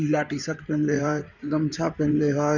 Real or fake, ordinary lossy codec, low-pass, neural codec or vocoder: fake; none; 7.2 kHz; codec, 16 kHz in and 24 kHz out, 2.2 kbps, FireRedTTS-2 codec